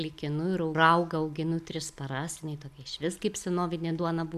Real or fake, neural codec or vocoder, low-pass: real; none; 14.4 kHz